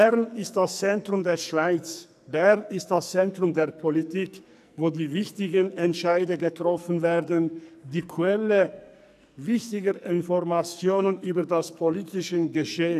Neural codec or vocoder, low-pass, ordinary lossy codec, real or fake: codec, 44.1 kHz, 2.6 kbps, SNAC; 14.4 kHz; none; fake